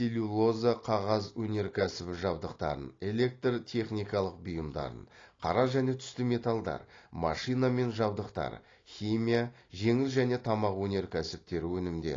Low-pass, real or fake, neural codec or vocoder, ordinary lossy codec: 7.2 kHz; real; none; AAC, 32 kbps